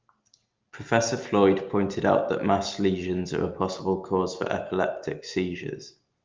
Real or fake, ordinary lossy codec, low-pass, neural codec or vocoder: real; Opus, 32 kbps; 7.2 kHz; none